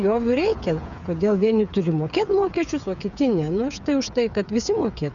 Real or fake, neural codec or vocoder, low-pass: fake; codec, 16 kHz, 8 kbps, FreqCodec, smaller model; 7.2 kHz